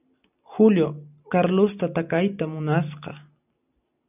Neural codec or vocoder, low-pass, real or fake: none; 3.6 kHz; real